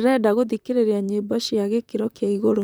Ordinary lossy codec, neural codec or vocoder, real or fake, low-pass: none; vocoder, 44.1 kHz, 128 mel bands, Pupu-Vocoder; fake; none